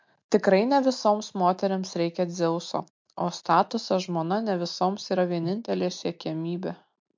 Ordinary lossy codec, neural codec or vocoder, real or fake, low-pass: MP3, 48 kbps; none; real; 7.2 kHz